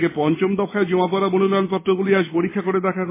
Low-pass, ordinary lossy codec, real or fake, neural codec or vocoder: 3.6 kHz; MP3, 16 kbps; real; none